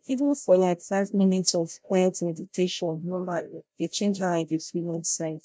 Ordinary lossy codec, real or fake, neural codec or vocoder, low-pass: none; fake; codec, 16 kHz, 0.5 kbps, FreqCodec, larger model; none